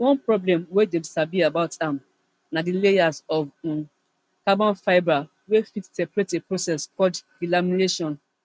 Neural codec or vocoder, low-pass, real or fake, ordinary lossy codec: none; none; real; none